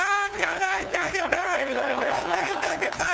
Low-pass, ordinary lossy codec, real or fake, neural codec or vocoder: none; none; fake; codec, 16 kHz, 2 kbps, FunCodec, trained on LibriTTS, 25 frames a second